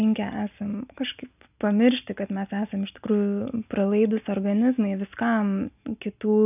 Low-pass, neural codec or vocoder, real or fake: 3.6 kHz; none; real